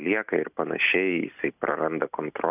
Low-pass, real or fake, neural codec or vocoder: 3.6 kHz; real; none